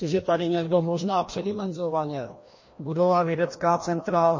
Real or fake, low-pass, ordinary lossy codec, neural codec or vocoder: fake; 7.2 kHz; MP3, 32 kbps; codec, 16 kHz, 1 kbps, FreqCodec, larger model